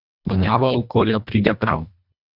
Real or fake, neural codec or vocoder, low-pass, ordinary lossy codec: fake; codec, 24 kHz, 1.5 kbps, HILCodec; 5.4 kHz; none